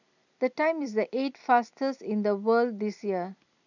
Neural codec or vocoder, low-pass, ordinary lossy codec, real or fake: none; 7.2 kHz; none; real